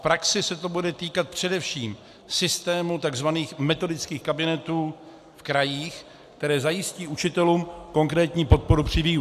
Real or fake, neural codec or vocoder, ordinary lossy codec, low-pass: real; none; AAC, 96 kbps; 14.4 kHz